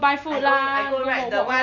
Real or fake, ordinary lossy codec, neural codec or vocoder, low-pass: real; none; none; 7.2 kHz